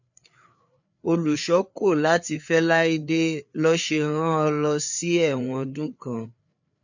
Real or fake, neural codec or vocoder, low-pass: fake; codec, 16 kHz, 4 kbps, FreqCodec, larger model; 7.2 kHz